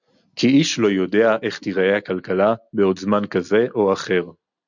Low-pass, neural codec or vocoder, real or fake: 7.2 kHz; none; real